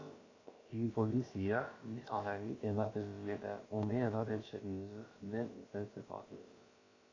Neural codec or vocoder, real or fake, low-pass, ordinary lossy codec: codec, 16 kHz, about 1 kbps, DyCAST, with the encoder's durations; fake; 7.2 kHz; AAC, 32 kbps